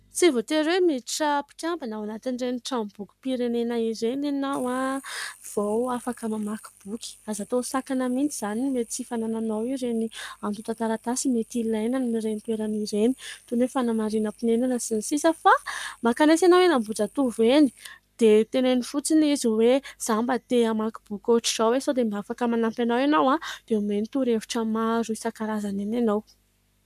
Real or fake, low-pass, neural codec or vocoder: fake; 14.4 kHz; codec, 44.1 kHz, 7.8 kbps, Pupu-Codec